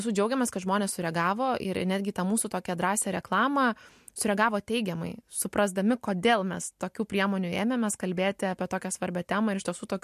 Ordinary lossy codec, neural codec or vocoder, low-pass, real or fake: MP3, 64 kbps; none; 14.4 kHz; real